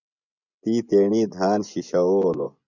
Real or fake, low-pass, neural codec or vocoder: fake; 7.2 kHz; vocoder, 24 kHz, 100 mel bands, Vocos